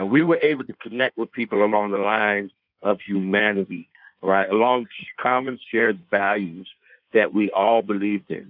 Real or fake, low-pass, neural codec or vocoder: fake; 5.4 kHz; codec, 16 kHz in and 24 kHz out, 1.1 kbps, FireRedTTS-2 codec